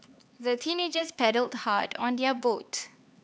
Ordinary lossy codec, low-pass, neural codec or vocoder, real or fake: none; none; codec, 16 kHz, 4 kbps, X-Codec, HuBERT features, trained on LibriSpeech; fake